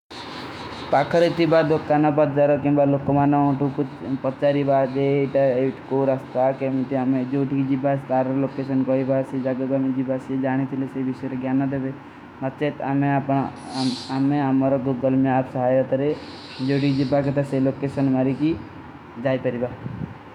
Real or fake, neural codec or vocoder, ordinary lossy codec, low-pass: fake; autoencoder, 48 kHz, 128 numbers a frame, DAC-VAE, trained on Japanese speech; none; 19.8 kHz